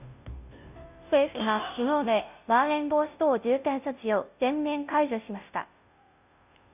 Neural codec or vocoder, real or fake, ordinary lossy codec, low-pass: codec, 16 kHz, 0.5 kbps, FunCodec, trained on Chinese and English, 25 frames a second; fake; none; 3.6 kHz